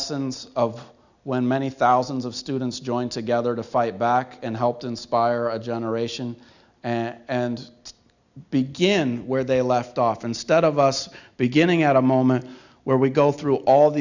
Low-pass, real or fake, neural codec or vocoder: 7.2 kHz; real; none